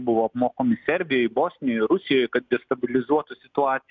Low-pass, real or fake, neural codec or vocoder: 7.2 kHz; real; none